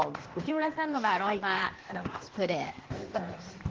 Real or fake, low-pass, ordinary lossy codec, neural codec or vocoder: fake; 7.2 kHz; Opus, 16 kbps; codec, 16 kHz, 0.8 kbps, ZipCodec